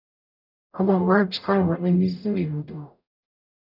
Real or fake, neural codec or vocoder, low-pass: fake; codec, 44.1 kHz, 0.9 kbps, DAC; 5.4 kHz